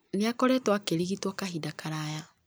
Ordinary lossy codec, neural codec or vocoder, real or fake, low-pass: none; none; real; none